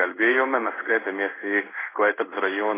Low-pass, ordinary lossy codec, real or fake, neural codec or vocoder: 3.6 kHz; AAC, 16 kbps; fake; codec, 16 kHz in and 24 kHz out, 1 kbps, XY-Tokenizer